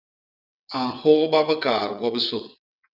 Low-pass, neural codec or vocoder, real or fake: 5.4 kHz; vocoder, 22.05 kHz, 80 mel bands, WaveNeXt; fake